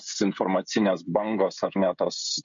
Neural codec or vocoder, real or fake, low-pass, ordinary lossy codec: codec, 16 kHz, 16 kbps, FreqCodec, smaller model; fake; 7.2 kHz; MP3, 48 kbps